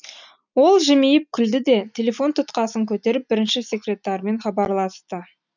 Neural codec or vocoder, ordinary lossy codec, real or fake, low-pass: none; none; real; 7.2 kHz